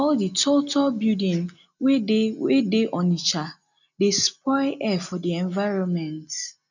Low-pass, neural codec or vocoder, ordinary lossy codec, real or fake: 7.2 kHz; none; none; real